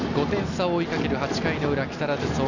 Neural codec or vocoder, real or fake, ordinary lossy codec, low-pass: none; real; none; 7.2 kHz